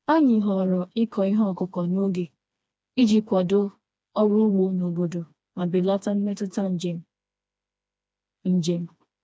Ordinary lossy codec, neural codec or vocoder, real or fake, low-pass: none; codec, 16 kHz, 2 kbps, FreqCodec, smaller model; fake; none